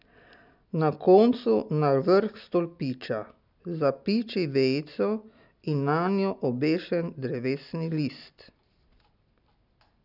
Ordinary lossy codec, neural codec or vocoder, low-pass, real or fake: none; none; 5.4 kHz; real